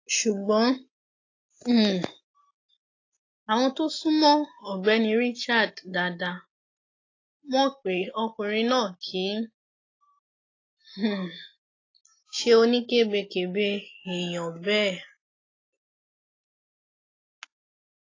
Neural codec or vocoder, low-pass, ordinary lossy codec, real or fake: none; 7.2 kHz; AAC, 32 kbps; real